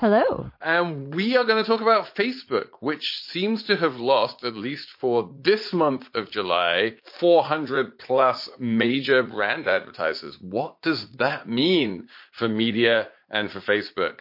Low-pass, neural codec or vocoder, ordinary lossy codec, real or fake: 5.4 kHz; vocoder, 44.1 kHz, 80 mel bands, Vocos; MP3, 32 kbps; fake